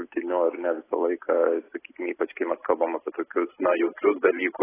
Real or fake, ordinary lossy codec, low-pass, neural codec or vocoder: real; AAC, 16 kbps; 3.6 kHz; none